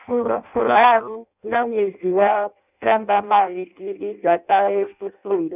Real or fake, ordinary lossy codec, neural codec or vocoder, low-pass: fake; none; codec, 16 kHz in and 24 kHz out, 0.6 kbps, FireRedTTS-2 codec; 3.6 kHz